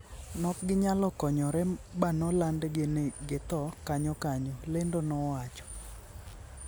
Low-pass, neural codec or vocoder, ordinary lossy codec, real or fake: none; none; none; real